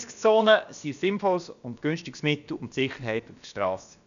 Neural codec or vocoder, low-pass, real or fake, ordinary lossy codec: codec, 16 kHz, about 1 kbps, DyCAST, with the encoder's durations; 7.2 kHz; fake; none